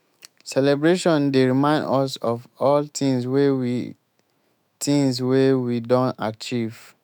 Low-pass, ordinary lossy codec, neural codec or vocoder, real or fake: none; none; autoencoder, 48 kHz, 128 numbers a frame, DAC-VAE, trained on Japanese speech; fake